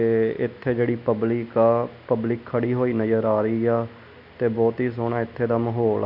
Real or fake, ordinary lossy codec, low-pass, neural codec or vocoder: real; none; 5.4 kHz; none